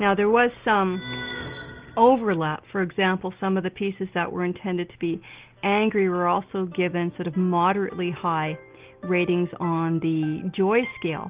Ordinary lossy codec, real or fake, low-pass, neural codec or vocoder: Opus, 32 kbps; real; 3.6 kHz; none